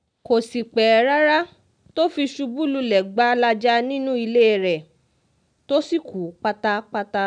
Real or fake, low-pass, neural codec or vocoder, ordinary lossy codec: real; 9.9 kHz; none; none